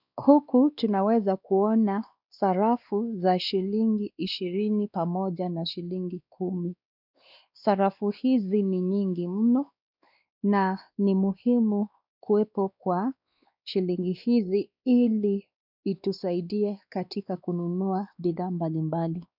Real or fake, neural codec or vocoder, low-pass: fake; codec, 16 kHz, 2 kbps, X-Codec, WavLM features, trained on Multilingual LibriSpeech; 5.4 kHz